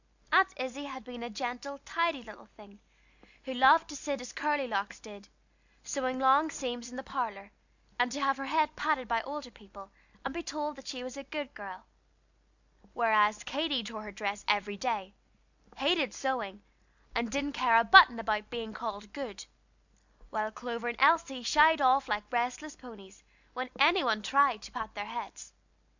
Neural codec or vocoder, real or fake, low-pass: none; real; 7.2 kHz